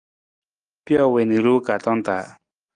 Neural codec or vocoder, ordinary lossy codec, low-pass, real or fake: none; Opus, 32 kbps; 9.9 kHz; real